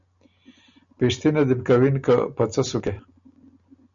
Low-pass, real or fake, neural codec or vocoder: 7.2 kHz; real; none